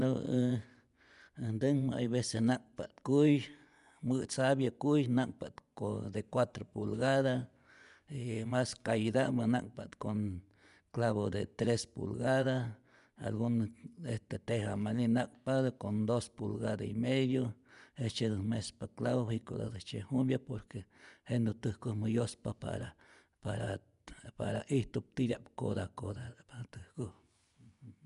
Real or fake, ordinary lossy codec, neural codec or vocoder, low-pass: fake; none; vocoder, 24 kHz, 100 mel bands, Vocos; 10.8 kHz